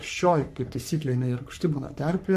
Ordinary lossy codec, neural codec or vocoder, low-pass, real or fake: MP3, 64 kbps; codec, 44.1 kHz, 3.4 kbps, Pupu-Codec; 14.4 kHz; fake